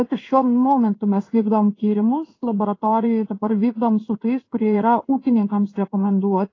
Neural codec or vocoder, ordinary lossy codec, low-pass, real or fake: codec, 16 kHz in and 24 kHz out, 1 kbps, XY-Tokenizer; AAC, 32 kbps; 7.2 kHz; fake